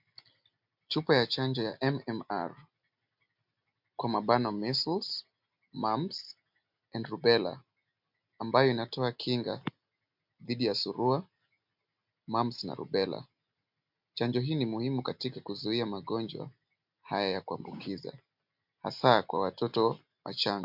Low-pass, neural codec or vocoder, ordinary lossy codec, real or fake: 5.4 kHz; none; MP3, 48 kbps; real